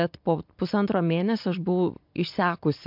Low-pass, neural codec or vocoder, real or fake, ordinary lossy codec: 5.4 kHz; none; real; MP3, 48 kbps